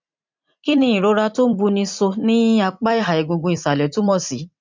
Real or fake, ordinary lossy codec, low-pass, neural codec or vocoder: fake; MP3, 64 kbps; 7.2 kHz; vocoder, 44.1 kHz, 128 mel bands every 256 samples, BigVGAN v2